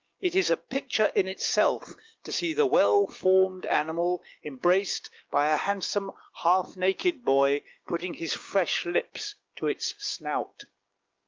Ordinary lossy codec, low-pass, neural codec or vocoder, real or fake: Opus, 32 kbps; 7.2 kHz; codec, 16 kHz, 6 kbps, DAC; fake